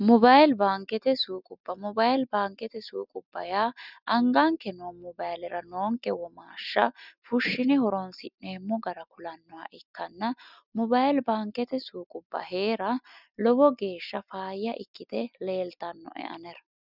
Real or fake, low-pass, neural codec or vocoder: real; 5.4 kHz; none